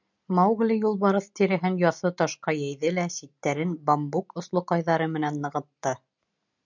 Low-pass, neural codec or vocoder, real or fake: 7.2 kHz; none; real